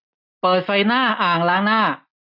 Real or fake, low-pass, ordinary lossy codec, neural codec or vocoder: real; 5.4 kHz; none; none